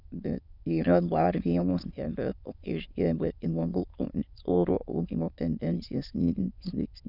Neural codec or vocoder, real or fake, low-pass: autoencoder, 22.05 kHz, a latent of 192 numbers a frame, VITS, trained on many speakers; fake; 5.4 kHz